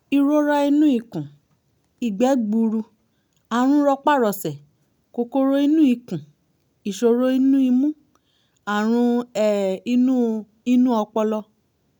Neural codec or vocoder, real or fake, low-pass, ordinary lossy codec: none; real; none; none